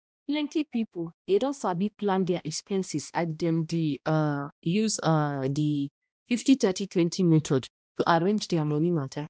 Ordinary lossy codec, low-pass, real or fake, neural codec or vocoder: none; none; fake; codec, 16 kHz, 1 kbps, X-Codec, HuBERT features, trained on balanced general audio